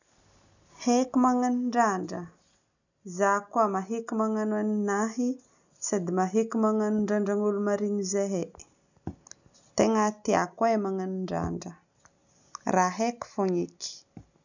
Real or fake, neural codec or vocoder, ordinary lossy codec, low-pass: real; none; none; 7.2 kHz